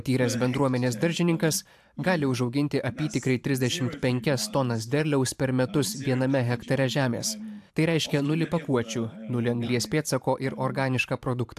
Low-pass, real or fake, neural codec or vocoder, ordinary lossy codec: 14.4 kHz; fake; vocoder, 44.1 kHz, 128 mel bands every 512 samples, BigVGAN v2; AAC, 96 kbps